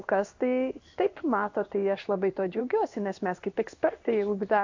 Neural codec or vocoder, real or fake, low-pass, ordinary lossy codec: codec, 16 kHz in and 24 kHz out, 1 kbps, XY-Tokenizer; fake; 7.2 kHz; AAC, 48 kbps